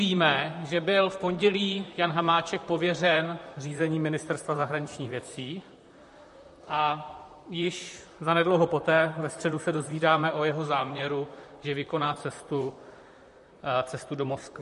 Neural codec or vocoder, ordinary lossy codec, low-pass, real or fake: vocoder, 44.1 kHz, 128 mel bands, Pupu-Vocoder; MP3, 48 kbps; 14.4 kHz; fake